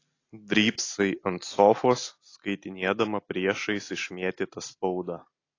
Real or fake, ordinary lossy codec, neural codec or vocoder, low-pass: real; AAC, 32 kbps; none; 7.2 kHz